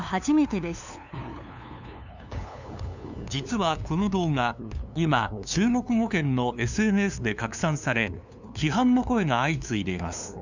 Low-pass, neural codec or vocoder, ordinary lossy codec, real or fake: 7.2 kHz; codec, 16 kHz, 2 kbps, FunCodec, trained on LibriTTS, 25 frames a second; none; fake